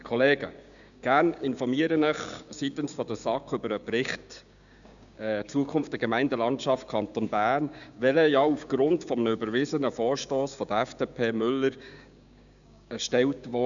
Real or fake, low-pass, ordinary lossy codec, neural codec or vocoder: fake; 7.2 kHz; none; codec, 16 kHz, 6 kbps, DAC